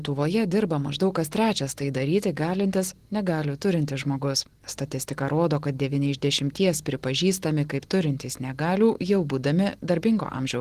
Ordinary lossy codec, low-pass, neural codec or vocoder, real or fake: Opus, 16 kbps; 14.4 kHz; none; real